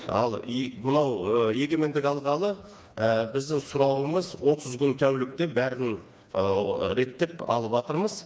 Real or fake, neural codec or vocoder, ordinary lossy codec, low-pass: fake; codec, 16 kHz, 2 kbps, FreqCodec, smaller model; none; none